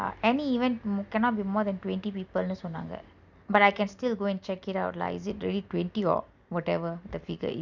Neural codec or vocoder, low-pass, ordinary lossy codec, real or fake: none; 7.2 kHz; none; real